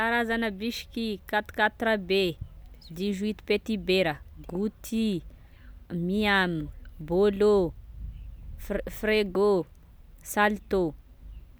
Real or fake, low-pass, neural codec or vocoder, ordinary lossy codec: real; none; none; none